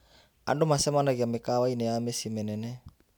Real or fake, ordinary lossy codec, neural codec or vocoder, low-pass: real; none; none; none